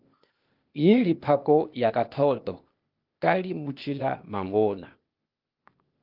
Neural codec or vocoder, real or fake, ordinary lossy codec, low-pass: codec, 16 kHz, 0.8 kbps, ZipCodec; fake; Opus, 32 kbps; 5.4 kHz